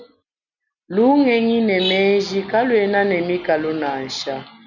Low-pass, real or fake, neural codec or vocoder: 7.2 kHz; real; none